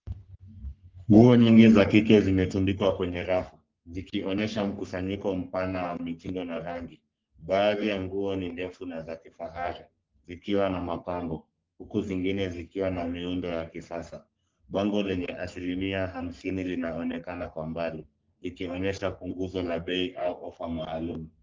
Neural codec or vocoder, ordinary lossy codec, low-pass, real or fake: codec, 44.1 kHz, 3.4 kbps, Pupu-Codec; Opus, 32 kbps; 7.2 kHz; fake